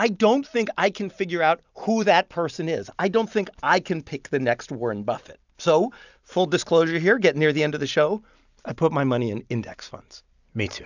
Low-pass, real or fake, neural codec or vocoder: 7.2 kHz; real; none